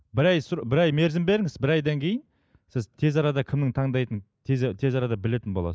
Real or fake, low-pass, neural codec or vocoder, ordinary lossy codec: real; none; none; none